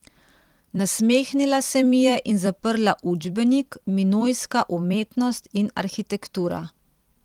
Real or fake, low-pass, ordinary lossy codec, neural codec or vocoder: fake; 19.8 kHz; Opus, 24 kbps; vocoder, 44.1 kHz, 128 mel bands every 256 samples, BigVGAN v2